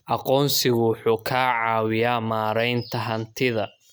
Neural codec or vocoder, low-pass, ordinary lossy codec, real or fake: none; none; none; real